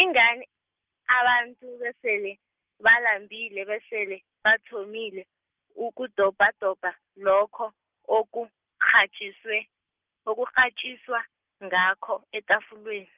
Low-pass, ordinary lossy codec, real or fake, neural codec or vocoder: 3.6 kHz; Opus, 32 kbps; real; none